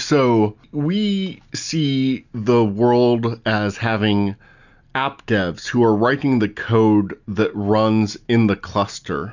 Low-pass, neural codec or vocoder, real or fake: 7.2 kHz; none; real